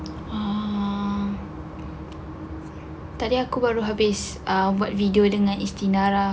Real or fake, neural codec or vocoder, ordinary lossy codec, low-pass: real; none; none; none